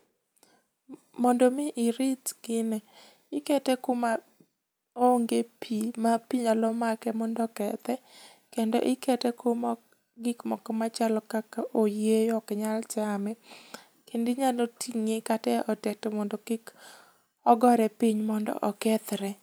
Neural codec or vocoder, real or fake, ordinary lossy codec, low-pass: none; real; none; none